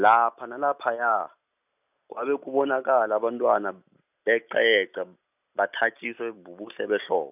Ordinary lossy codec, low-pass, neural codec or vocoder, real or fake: none; 3.6 kHz; none; real